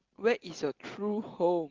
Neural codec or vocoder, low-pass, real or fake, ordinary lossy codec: none; 7.2 kHz; real; Opus, 16 kbps